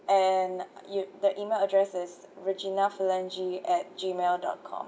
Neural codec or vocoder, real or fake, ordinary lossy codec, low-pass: none; real; none; none